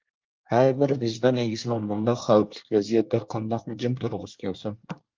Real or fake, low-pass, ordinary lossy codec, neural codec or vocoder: fake; 7.2 kHz; Opus, 24 kbps; codec, 24 kHz, 1 kbps, SNAC